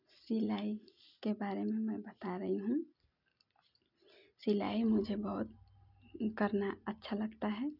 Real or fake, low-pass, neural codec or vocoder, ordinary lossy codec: real; 5.4 kHz; none; none